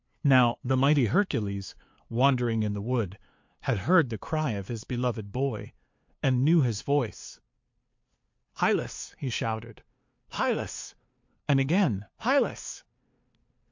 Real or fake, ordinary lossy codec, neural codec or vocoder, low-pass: fake; MP3, 48 kbps; codec, 16 kHz, 2 kbps, FunCodec, trained on LibriTTS, 25 frames a second; 7.2 kHz